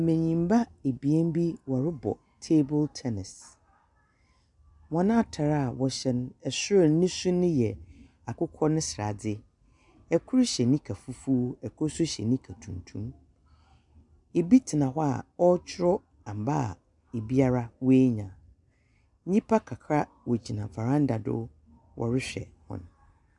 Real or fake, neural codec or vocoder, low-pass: real; none; 10.8 kHz